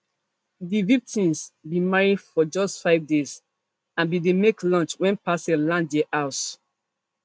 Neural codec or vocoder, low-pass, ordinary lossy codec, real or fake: none; none; none; real